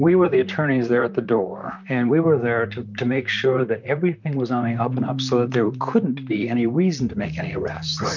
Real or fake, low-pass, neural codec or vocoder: fake; 7.2 kHz; vocoder, 44.1 kHz, 128 mel bands, Pupu-Vocoder